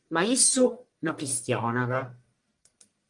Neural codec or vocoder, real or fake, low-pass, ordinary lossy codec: codec, 44.1 kHz, 1.7 kbps, Pupu-Codec; fake; 10.8 kHz; Opus, 32 kbps